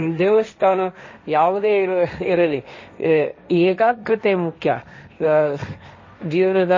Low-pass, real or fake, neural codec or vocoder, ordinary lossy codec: 7.2 kHz; fake; codec, 16 kHz, 1.1 kbps, Voila-Tokenizer; MP3, 32 kbps